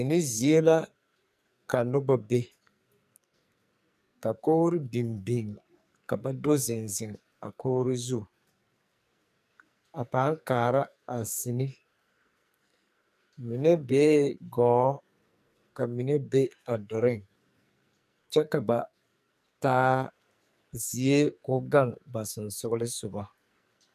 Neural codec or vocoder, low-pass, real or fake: codec, 44.1 kHz, 2.6 kbps, SNAC; 14.4 kHz; fake